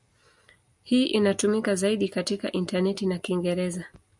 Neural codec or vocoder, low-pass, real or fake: none; 10.8 kHz; real